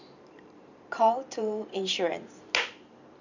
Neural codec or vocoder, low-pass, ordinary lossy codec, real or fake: vocoder, 44.1 kHz, 128 mel bands every 512 samples, BigVGAN v2; 7.2 kHz; none; fake